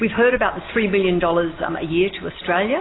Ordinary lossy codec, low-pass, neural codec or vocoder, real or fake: AAC, 16 kbps; 7.2 kHz; none; real